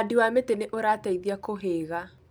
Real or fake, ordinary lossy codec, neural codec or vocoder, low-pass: real; none; none; none